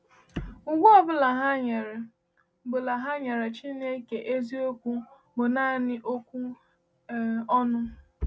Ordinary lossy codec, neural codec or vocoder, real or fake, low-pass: none; none; real; none